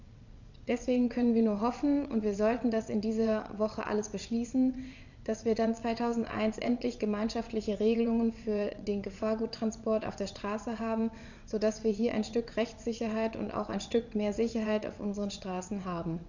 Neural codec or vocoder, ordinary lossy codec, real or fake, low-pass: vocoder, 22.05 kHz, 80 mel bands, WaveNeXt; none; fake; 7.2 kHz